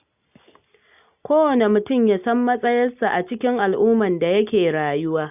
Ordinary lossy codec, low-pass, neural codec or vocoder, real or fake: none; 3.6 kHz; none; real